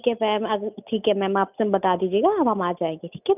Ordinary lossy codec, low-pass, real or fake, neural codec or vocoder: none; 3.6 kHz; real; none